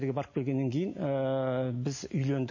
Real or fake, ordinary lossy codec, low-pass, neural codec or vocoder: real; MP3, 32 kbps; 7.2 kHz; none